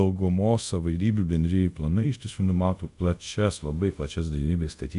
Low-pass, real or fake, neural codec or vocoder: 10.8 kHz; fake; codec, 24 kHz, 0.5 kbps, DualCodec